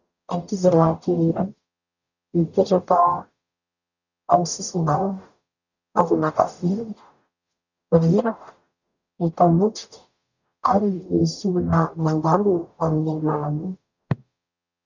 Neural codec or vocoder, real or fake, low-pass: codec, 44.1 kHz, 0.9 kbps, DAC; fake; 7.2 kHz